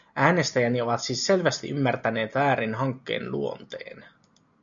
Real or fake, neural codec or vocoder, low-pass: real; none; 7.2 kHz